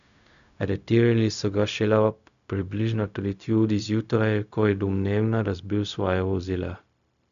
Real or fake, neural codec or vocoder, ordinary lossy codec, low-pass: fake; codec, 16 kHz, 0.4 kbps, LongCat-Audio-Codec; none; 7.2 kHz